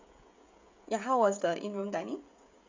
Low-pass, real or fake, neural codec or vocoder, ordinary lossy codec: 7.2 kHz; fake; codec, 16 kHz, 16 kbps, FreqCodec, smaller model; MP3, 64 kbps